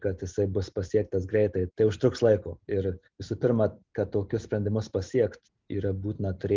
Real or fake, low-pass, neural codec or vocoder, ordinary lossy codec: real; 7.2 kHz; none; Opus, 24 kbps